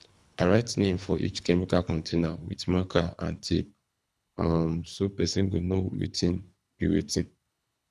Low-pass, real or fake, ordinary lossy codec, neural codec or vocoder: none; fake; none; codec, 24 kHz, 3 kbps, HILCodec